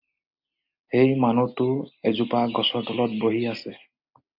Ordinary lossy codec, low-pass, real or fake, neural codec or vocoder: MP3, 48 kbps; 5.4 kHz; real; none